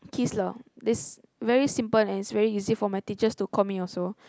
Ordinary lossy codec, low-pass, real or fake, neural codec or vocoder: none; none; real; none